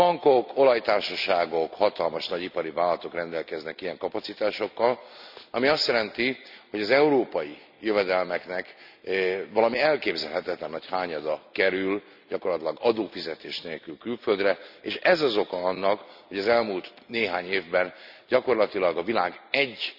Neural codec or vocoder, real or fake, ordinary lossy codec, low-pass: none; real; none; 5.4 kHz